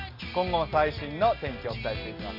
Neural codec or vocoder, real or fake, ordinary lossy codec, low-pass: none; real; none; 5.4 kHz